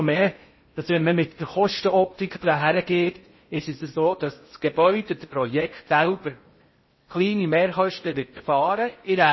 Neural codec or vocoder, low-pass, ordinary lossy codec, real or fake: codec, 16 kHz in and 24 kHz out, 0.6 kbps, FocalCodec, streaming, 4096 codes; 7.2 kHz; MP3, 24 kbps; fake